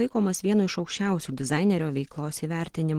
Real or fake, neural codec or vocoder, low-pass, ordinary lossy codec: real; none; 19.8 kHz; Opus, 16 kbps